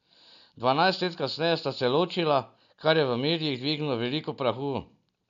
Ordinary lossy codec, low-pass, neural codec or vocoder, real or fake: none; 7.2 kHz; none; real